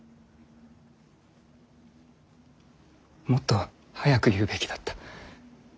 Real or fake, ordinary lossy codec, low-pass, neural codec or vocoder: real; none; none; none